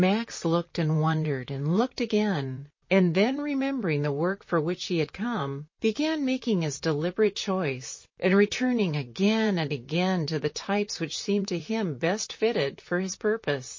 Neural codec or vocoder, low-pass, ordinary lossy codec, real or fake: vocoder, 44.1 kHz, 128 mel bands, Pupu-Vocoder; 7.2 kHz; MP3, 32 kbps; fake